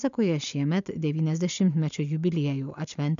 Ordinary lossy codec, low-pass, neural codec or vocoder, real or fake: AAC, 96 kbps; 7.2 kHz; none; real